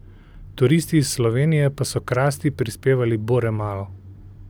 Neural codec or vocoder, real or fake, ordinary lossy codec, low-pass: none; real; none; none